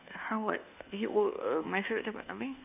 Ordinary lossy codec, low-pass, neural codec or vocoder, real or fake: none; 3.6 kHz; codec, 24 kHz, 1.2 kbps, DualCodec; fake